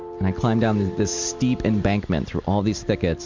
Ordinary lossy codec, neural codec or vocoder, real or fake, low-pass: MP3, 64 kbps; none; real; 7.2 kHz